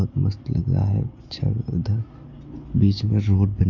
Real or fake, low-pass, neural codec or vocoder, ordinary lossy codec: real; 7.2 kHz; none; none